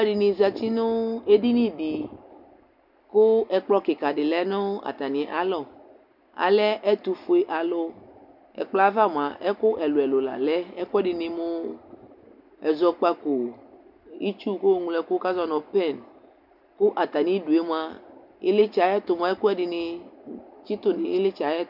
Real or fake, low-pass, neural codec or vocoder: real; 5.4 kHz; none